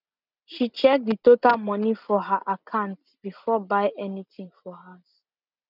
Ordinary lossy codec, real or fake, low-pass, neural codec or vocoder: none; real; 5.4 kHz; none